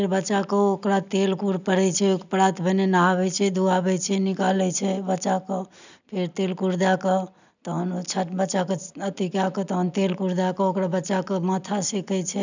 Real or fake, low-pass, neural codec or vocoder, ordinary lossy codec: real; 7.2 kHz; none; none